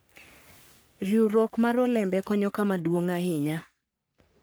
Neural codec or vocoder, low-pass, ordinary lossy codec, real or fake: codec, 44.1 kHz, 3.4 kbps, Pupu-Codec; none; none; fake